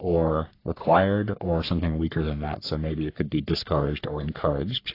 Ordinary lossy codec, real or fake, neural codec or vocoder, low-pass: AAC, 32 kbps; fake; codec, 44.1 kHz, 3.4 kbps, Pupu-Codec; 5.4 kHz